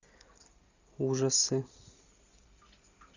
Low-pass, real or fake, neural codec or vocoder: 7.2 kHz; real; none